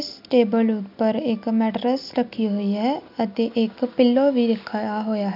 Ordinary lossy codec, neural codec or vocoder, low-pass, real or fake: none; none; 5.4 kHz; real